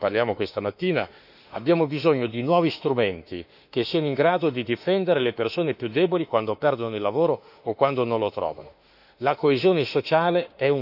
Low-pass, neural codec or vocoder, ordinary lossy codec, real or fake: 5.4 kHz; autoencoder, 48 kHz, 32 numbers a frame, DAC-VAE, trained on Japanese speech; none; fake